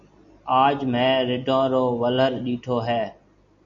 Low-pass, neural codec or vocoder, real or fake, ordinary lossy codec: 7.2 kHz; none; real; MP3, 96 kbps